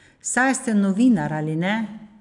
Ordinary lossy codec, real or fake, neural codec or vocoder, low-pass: none; real; none; 10.8 kHz